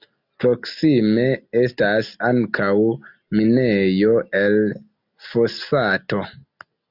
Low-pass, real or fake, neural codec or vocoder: 5.4 kHz; real; none